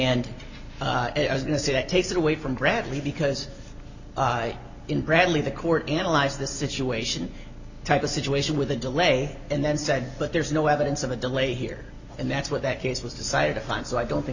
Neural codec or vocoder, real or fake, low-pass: none; real; 7.2 kHz